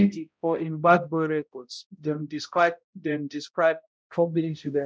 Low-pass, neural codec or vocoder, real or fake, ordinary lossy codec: none; codec, 16 kHz, 0.5 kbps, X-Codec, HuBERT features, trained on balanced general audio; fake; none